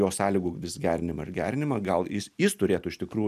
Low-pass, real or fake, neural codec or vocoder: 14.4 kHz; real; none